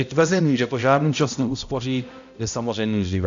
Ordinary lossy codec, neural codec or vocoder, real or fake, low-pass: AAC, 64 kbps; codec, 16 kHz, 0.5 kbps, X-Codec, HuBERT features, trained on balanced general audio; fake; 7.2 kHz